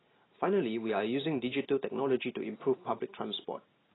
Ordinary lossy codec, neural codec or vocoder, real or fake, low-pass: AAC, 16 kbps; none; real; 7.2 kHz